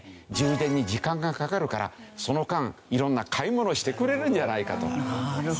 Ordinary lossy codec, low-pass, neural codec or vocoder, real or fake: none; none; none; real